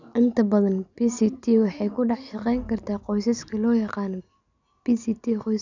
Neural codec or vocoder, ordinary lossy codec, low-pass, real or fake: none; none; 7.2 kHz; real